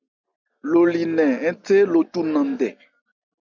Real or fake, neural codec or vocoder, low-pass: real; none; 7.2 kHz